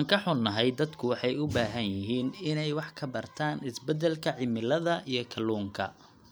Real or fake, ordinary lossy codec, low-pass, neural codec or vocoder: real; none; none; none